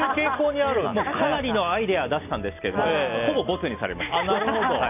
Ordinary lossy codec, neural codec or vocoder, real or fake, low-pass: none; none; real; 3.6 kHz